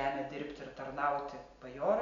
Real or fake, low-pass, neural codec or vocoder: real; 7.2 kHz; none